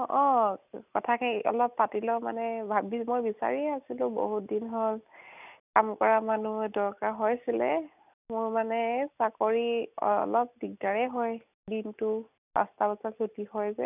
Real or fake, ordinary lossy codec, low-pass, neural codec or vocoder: real; none; 3.6 kHz; none